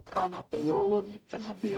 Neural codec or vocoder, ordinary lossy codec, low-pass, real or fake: codec, 44.1 kHz, 0.9 kbps, DAC; none; 19.8 kHz; fake